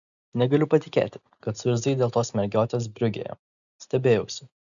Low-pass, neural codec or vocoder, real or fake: 7.2 kHz; none; real